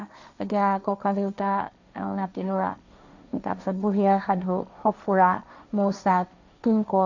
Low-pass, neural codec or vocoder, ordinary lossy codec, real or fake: 7.2 kHz; codec, 16 kHz, 1.1 kbps, Voila-Tokenizer; none; fake